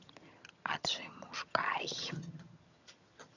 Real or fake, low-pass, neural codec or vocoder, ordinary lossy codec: fake; 7.2 kHz; vocoder, 22.05 kHz, 80 mel bands, HiFi-GAN; none